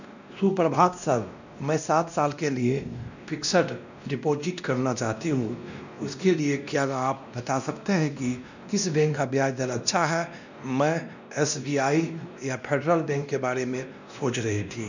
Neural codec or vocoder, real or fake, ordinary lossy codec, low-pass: codec, 16 kHz, 1 kbps, X-Codec, WavLM features, trained on Multilingual LibriSpeech; fake; none; 7.2 kHz